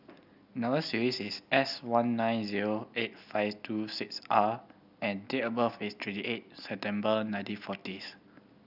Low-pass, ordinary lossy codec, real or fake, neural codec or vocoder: 5.4 kHz; none; real; none